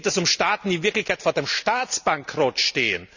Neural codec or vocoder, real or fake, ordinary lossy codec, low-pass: none; real; none; 7.2 kHz